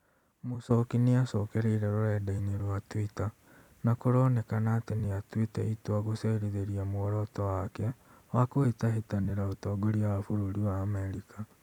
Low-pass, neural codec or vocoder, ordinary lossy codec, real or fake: 19.8 kHz; vocoder, 44.1 kHz, 128 mel bands every 256 samples, BigVGAN v2; none; fake